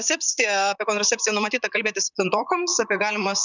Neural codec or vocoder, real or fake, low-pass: vocoder, 44.1 kHz, 128 mel bands, Pupu-Vocoder; fake; 7.2 kHz